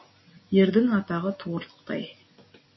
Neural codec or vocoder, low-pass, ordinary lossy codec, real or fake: none; 7.2 kHz; MP3, 24 kbps; real